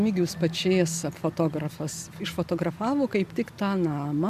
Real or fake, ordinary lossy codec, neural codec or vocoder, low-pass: real; MP3, 96 kbps; none; 14.4 kHz